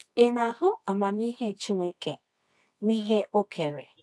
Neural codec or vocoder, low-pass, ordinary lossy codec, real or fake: codec, 24 kHz, 0.9 kbps, WavTokenizer, medium music audio release; none; none; fake